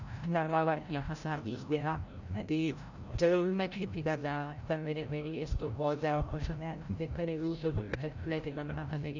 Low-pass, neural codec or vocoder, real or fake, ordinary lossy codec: 7.2 kHz; codec, 16 kHz, 0.5 kbps, FreqCodec, larger model; fake; none